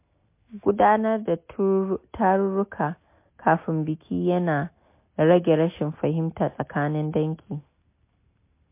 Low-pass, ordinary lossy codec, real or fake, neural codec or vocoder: 3.6 kHz; MP3, 24 kbps; real; none